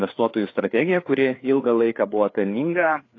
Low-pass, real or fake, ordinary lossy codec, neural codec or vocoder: 7.2 kHz; fake; AAC, 32 kbps; codec, 16 kHz, 4 kbps, FreqCodec, larger model